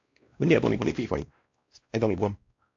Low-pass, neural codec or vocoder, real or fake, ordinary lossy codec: 7.2 kHz; codec, 16 kHz, 1 kbps, X-Codec, WavLM features, trained on Multilingual LibriSpeech; fake; AAC, 48 kbps